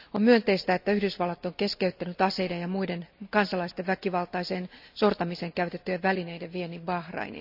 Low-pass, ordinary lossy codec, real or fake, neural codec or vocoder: 5.4 kHz; none; real; none